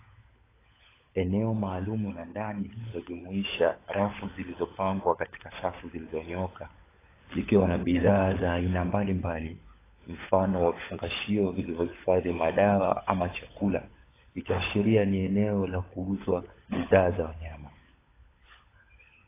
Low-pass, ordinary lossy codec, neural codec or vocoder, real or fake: 3.6 kHz; AAC, 16 kbps; codec, 16 kHz, 16 kbps, FunCodec, trained on LibriTTS, 50 frames a second; fake